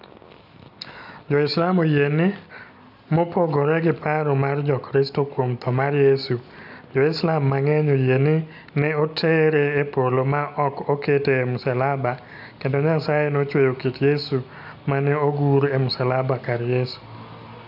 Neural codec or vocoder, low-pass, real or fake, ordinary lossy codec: none; 5.4 kHz; real; none